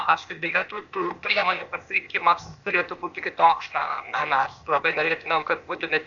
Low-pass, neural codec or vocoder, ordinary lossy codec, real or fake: 7.2 kHz; codec, 16 kHz, 0.8 kbps, ZipCodec; Opus, 64 kbps; fake